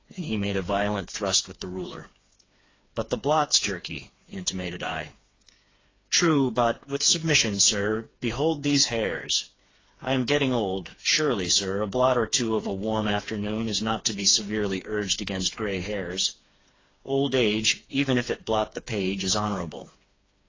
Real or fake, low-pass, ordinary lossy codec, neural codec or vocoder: fake; 7.2 kHz; AAC, 32 kbps; codec, 16 kHz, 4 kbps, FreqCodec, smaller model